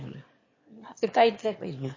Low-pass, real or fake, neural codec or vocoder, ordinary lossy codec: 7.2 kHz; fake; autoencoder, 22.05 kHz, a latent of 192 numbers a frame, VITS, trained on one speaker; MP3, 32 kbps